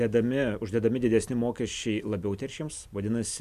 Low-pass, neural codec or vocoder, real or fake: 14.4 kHz; none; real